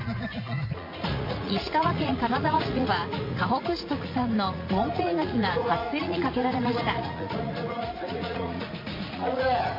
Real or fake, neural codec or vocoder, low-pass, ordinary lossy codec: fake; vocoder, 44.1 kHz, 80 mel bands, Vocos; 5.4 kHz; MP3, 32 kbps